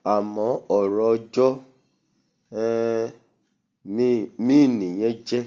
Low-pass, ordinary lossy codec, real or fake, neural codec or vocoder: 7.2 kHz; Opus, 32 kbps; real; none